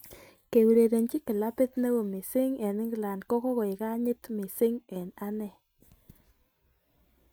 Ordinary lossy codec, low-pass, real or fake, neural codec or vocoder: none; none; real; none